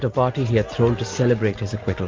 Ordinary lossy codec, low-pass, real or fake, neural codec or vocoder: Opus, 32 kbps; 7.2 kHz; real; none